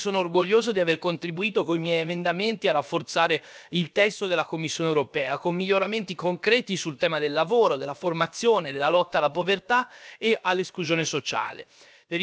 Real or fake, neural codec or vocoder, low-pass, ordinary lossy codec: fake; codec, 16 kHz, about 1 kbps, DyCAST, with the encoder's durations; none; none